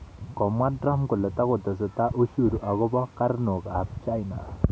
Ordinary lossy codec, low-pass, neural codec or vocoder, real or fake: none; none; none; real